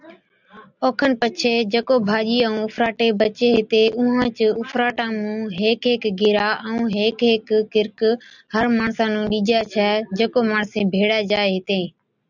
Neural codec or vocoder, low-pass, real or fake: none; 7.2 kHz; real